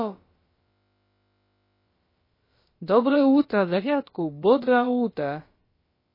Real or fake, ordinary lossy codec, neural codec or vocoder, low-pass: fake; MP3, 24 kbps; codec, 16 kHz, about 1 kbps, DyCAST, with the encoder's durations; 5.4 kHz